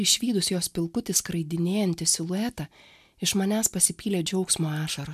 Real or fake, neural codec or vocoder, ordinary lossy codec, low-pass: real; none; MP3, 96 kbps; 14.4 kHz